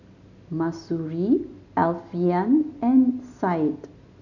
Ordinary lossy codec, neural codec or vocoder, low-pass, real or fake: none; none; 7.2 kHz; real